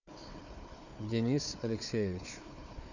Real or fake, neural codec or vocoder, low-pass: fake; codec, 16 kHz, 4 kbps, FunCodec, trained on Chinese and English, 50 frames a second; 7.2 kHz